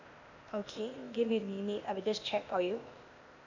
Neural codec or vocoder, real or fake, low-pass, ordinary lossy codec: codec, 16 kHz, 0.8 kbps, ZipCodec; fake; 7.2 kHz; none